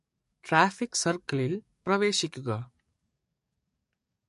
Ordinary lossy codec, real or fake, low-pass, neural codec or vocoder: MP3, 48 kbps; fake; 14.4 kHz; codec, 44.1 kHz, 7.8 kbps, DAC